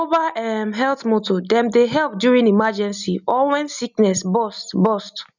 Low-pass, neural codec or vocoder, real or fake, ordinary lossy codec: 7.2 kHz; none; real; none